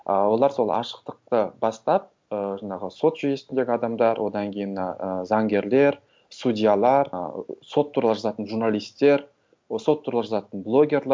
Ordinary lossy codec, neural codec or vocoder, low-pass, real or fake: none; none; none; real